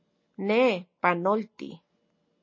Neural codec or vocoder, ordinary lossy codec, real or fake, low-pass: none; MP3, 32 kbps; real; 7.2 kHz